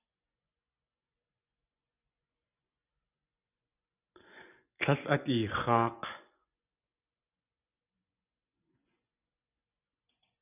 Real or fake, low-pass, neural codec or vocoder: fake; 3.6 kHz; vocoder, 24 kHz, 100 mel bands, Vocos